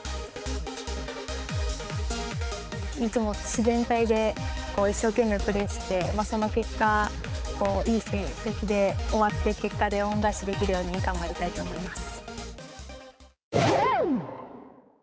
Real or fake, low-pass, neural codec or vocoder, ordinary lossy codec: fake; none; codec, 16 kHz, 4 kbps, X-Codec, HuBERT features, trained on balanced general audio; none